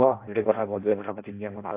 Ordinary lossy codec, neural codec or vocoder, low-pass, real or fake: AAC, 24 kbps; codec, 16 kHz in and 24 kHz out, 0.6 kbps, FireRedTTS-2 codec; 3.6 kHz; fake